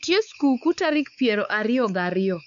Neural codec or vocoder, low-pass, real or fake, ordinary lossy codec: codec, 16 kHz, 4 kbps, X-Codec, WavLM features, trained on Multilingual LibriSpeech; 7.2 kHz; fake; none